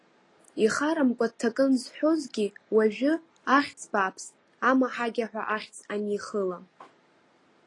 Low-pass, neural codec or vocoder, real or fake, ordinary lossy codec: 10.8 kHz; none; real; AAC, 32 kbps